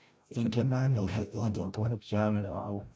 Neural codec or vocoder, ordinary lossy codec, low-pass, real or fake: codec, 16 kHz, 0.5 kbps, FreqCodec, larger model; none; none; fake